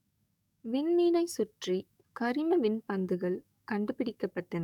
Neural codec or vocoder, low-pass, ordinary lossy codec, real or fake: codec, 44.1 kHz, 7.8 kbps, DAC; 19.8 kHz; none; fake